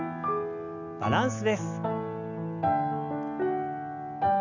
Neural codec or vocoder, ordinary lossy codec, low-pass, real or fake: none; none; 7.2 kHz; real